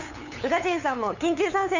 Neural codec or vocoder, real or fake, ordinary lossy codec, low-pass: codec, 16 kHz, 8 kbps, FunCodec, trained on LibriTTS, 25 frames a second; fake; none; 7.2 kHz